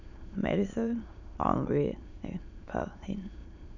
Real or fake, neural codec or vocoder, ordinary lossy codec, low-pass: fake; autoencoder, 22.05 kHz, a latent of 192 numbers a frame, VITS, trained on many speakers; none; 7.2 kHz